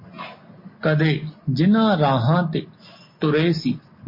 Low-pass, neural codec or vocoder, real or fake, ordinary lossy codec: 5.4 kHz; none; real; MP3, 24 kbps